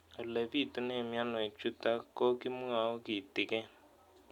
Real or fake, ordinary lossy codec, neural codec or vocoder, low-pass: real; none; none; 19.8 kHz